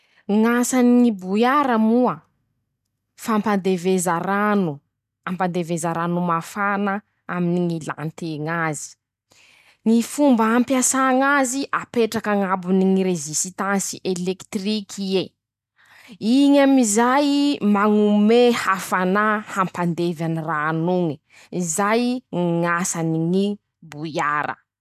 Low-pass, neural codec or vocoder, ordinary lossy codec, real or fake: 14.4 kHz; none; none; real